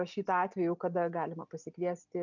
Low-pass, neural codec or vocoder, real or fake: 7.2 kHz; none; real